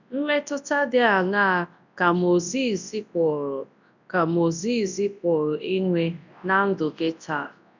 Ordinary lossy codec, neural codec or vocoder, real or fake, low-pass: none; codec, 24 kHz, 0.9 kbps, WavTokenizer, large speech release; fake; 7.2 kHz